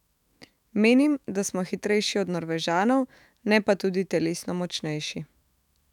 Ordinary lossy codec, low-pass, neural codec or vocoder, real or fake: none; 19.8 kHz; autoencoder, 48 kHz, 128 numbers a frame, DAC-VAE, trained on Japanese speech; fake